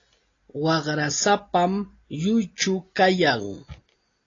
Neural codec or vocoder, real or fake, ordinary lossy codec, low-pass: none; real; AAC, 32 kbps; 7.2 kHz